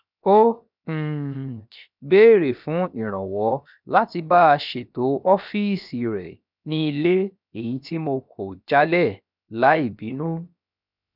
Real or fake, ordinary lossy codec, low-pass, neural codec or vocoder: fake; none; 5.4 kHz; codec, 16 kHz, 0.7 kbps, FocalCodec